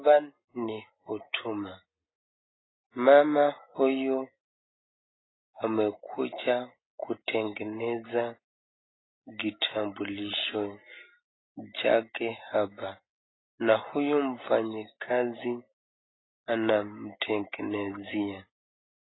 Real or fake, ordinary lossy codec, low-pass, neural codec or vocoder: real; AAC, 16 kbps; 7.2 kHz; none